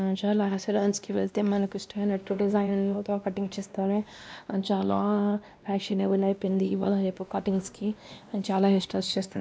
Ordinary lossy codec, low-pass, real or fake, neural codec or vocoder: none; none; fake; codec, 16 kHz, 1 kbps, X-Codec, WavLM features, trained on Multilingual LibriSpeech